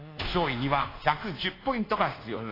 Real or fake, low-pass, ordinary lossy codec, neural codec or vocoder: fake; 5.4 kHz; AAC, 24 kbps; codec, 24 kHz, 1.2 kbps, DualCodec